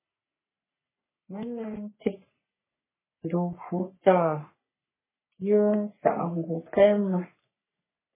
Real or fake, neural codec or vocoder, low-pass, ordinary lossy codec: fake; codec, 44.1 kHz, 3.4 kbps, Pupu-Codec; 3.6 kHz; MP3, 16 kbps